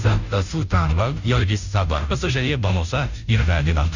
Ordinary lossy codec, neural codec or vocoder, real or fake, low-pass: none; codec, 16 kHz, 0.5 kbps, FunCodec, trained on Chinese and English, 25 frames a second; fake; 7.2 kHz